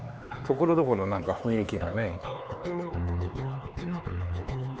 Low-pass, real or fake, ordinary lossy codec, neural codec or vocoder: none; fake; none; codec, 16 kHz, 4 kbps, X-Codec, HuBERT features, trained on LibriSpeech